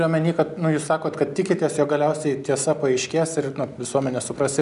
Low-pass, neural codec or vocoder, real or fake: 10.8 kHz; none; real